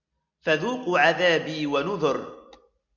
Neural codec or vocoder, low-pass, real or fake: none; 7.2 kHz; real